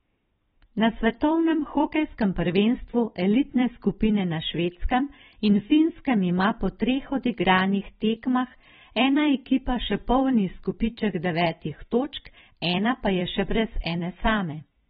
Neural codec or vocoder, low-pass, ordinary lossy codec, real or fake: vocoder, 44.1 kHz, 128 mel bands every 256 samples, BigVGAN v2; 19.8 kHz; AAC, 16 kbps; fake